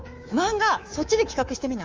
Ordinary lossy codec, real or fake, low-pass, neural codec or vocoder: Opus, 32 kbps; fake; 7.2 kHz; codec, 24 kHz, 3.1 kbps, DualCodec